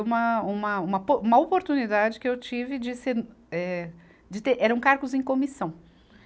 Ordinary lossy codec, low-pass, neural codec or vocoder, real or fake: none; none; none; real